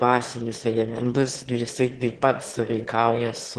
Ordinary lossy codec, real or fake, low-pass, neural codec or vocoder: Opus, 16 kbps; fake; 9.9 kHz; autoencoder, 22.05 kHz, a latent of 192 numbers a frame, VITS, trained on one speaker